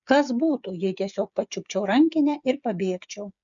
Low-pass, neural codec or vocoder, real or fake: 7.2 kHz; codec, 16 kHz, 8 kbps, FreqCodec, smaller model; fake